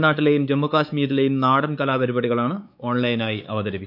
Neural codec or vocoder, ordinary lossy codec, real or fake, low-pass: codec, 16 kHz, 4 kbps, X-Codec, WavLM features, trained on Multilingual LibriSpeech; none; fake; 5.4 kHz